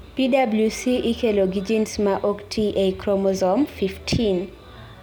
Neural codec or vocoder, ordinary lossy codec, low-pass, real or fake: none; none; none; real